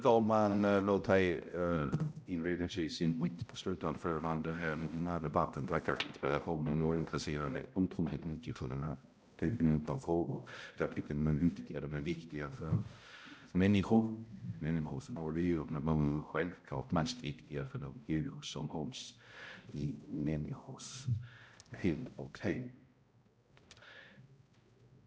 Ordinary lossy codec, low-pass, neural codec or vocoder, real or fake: none; none; codec, 16 kHz, 0.5 kbps, X-Codec, HuBERT features, trained on balanced general audio; fake